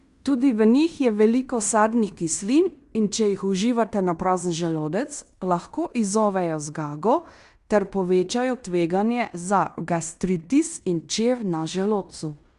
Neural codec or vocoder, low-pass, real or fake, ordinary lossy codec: codec, 16 kHz in and 24 kHz out, 0.9 kbps, LongCat-Audio-Codec, fine tuned four codebook decoder; 10.8 kHz; fake; Opus, 64 kbps